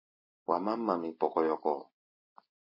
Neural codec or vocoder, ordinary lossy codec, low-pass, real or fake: none; MP3, 24 kbps; 5.4 kHz; real